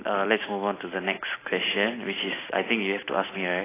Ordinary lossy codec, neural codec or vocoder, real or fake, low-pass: AAC, 16 kbps; none; real; 3.6 kHz